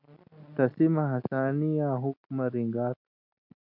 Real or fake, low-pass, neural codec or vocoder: real; 5.4 kHz; none